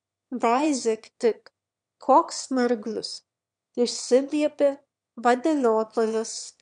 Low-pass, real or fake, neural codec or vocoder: 9.9 kHz; fake; autoencoder, 22.05 kHz, a latent of 192 numbers a frame, VITS, trained on one speaker